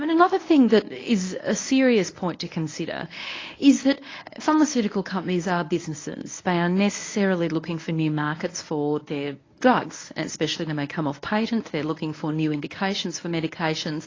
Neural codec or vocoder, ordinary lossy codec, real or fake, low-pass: codec, 24 kHz, 0.9 kbps, WavTokenizer, medium speech release version 1; AAC, 32 kbps; fake; 7.2 kHz